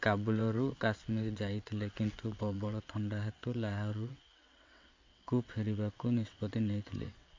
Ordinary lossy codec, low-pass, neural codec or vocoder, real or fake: MP3, 48 kbps; 7.2 kHz; vocoder, 22.05 kHz, 80 mel bands, WaveNeXt; fake